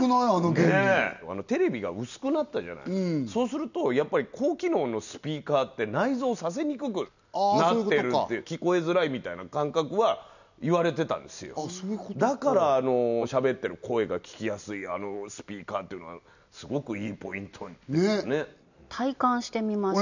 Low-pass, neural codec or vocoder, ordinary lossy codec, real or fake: 7.2 kHz; none; none; real